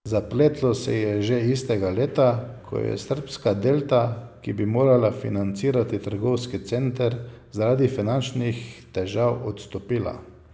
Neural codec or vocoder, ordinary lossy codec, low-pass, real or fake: none; none; none; real